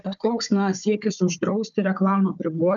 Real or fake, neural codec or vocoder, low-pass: fake; codec, 16 kHz, 4 kbps, FunCodec, trained on Chinese and English, 50 frames a second; 7.2 kHz